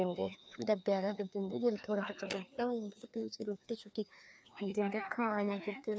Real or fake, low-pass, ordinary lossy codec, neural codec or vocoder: fake; none; none; codec, 16 kHz, 2 kbps, FreqCodec, larger model